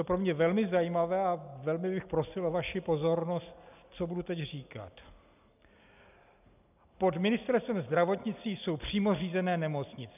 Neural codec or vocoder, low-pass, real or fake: none; 3.6 kHz; real